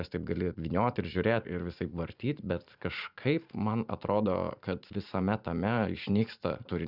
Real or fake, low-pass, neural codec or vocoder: fake; 5.4 kHz; vocoder, 44.1 kHz, 128 mel bands every 512 samples, BigVGAN v2